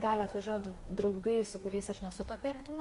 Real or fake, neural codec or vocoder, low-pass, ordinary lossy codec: fake; codec, 24 kHz, 1 kbps, SNAC; 10.8 kHz; MP3, 48 kbps